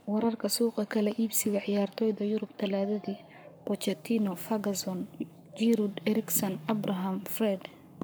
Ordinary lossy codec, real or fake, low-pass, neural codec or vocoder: none; fake; none; codec, 44.1 kHz, 7.8 kbps, Pupu-Codec